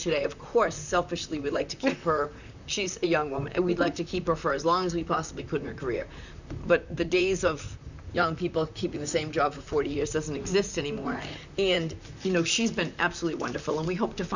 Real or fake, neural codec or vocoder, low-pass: fake; vocoder, 44.1 kHz, 128 mel bands, Pupu-Vocoder; 7.2 kHz